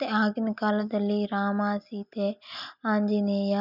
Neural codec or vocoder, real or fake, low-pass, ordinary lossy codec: none; real; 5.4 kHz; none